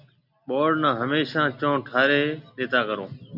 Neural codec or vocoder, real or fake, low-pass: none; real; 5.4 kHz